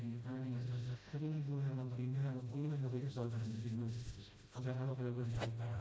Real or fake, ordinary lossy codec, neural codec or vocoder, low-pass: fake; none; codec, 16 kHz, 0.5 kbps, FreqCodec, smaller model; none